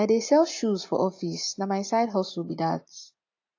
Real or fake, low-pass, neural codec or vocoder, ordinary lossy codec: real; 7.2 kHz; none; AAC, 48 kbps